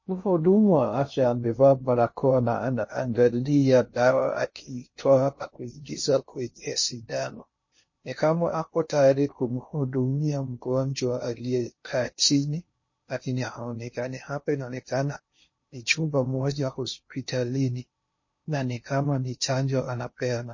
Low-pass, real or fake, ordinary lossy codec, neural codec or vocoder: 7.2 kHz; fake; MP3, 32 kbps; codec, 16 kHz in and 24 kHz out, 0.6 kbps, FocalCodec, streaming, 2048 codes